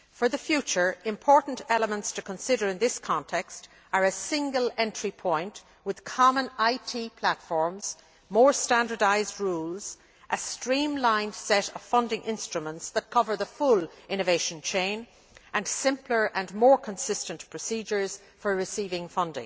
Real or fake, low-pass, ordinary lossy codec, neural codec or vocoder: real; none; none; none